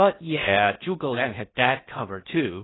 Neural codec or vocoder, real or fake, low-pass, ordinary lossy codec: codec, 16 kHz in and 24 kHz out, 0.6 kbps, FocalCodec, streaming, 4096 codes; fake; 7.2 kHz; AAC, 16 kbps